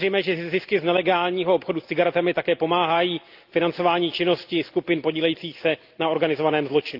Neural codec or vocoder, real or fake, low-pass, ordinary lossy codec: none; real; 5.4 kHz; Opus, 32 kbps